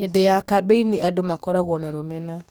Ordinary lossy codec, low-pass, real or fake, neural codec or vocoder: none; none; fake; codec, 44.1 kHz, 2.6 kbps, DAC